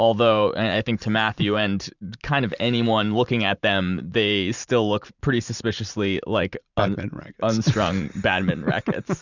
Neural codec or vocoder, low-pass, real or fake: vocoder, 44.1 kHz, 128 mel bands every 512 samples, BigVGAN v2; 7.2 kHz; fake